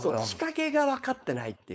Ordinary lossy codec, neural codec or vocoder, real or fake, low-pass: none; codec, 16 kHz, 4.8 kbps, FACodec; fake; none